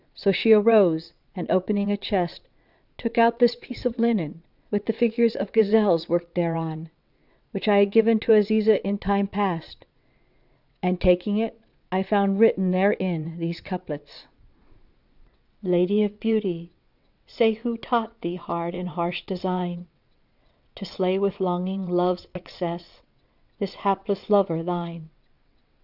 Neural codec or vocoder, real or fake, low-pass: vocoder, 22.05 kHz, 80 mel bands, WaveNeXt; fake; 5.4 kHz